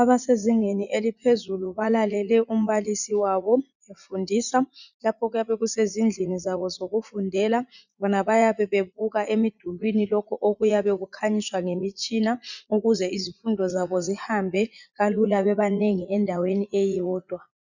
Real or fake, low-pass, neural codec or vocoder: fake; 7.2 kHz; vocoder, 44.1 kHz, 80 mel bands, Vocos